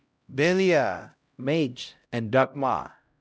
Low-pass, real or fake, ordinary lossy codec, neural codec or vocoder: none; fake; none; codec, 16 kHz, 0.5 kbps, X-Codec, HuBERT features, trained on LibriSpeech